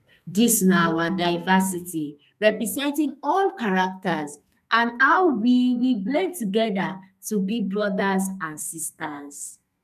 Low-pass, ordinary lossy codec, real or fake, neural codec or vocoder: 14.4 kHz; none; fake; codec, 32 kHz, 1.9 kbps, SNAC